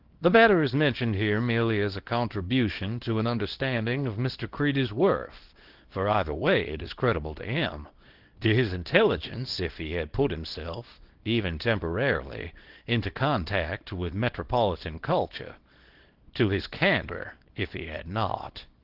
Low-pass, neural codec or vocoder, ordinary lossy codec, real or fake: 5.4 kHz; codec, 24 kHz, 0.9 kbps, WavTokenizer, small release; Opus, 16 kbps; fake